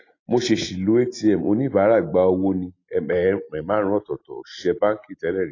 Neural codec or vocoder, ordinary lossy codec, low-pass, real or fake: none; AAC, 32 kbps; 7.2 kHz; real